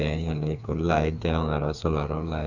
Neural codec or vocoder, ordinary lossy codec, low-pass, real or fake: codec, 16 kHz, 4 kbps, FreqCodec, smaller model; none; 7.2 kHz; fake